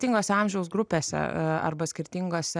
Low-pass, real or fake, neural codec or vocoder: 9.9 kHz; real; none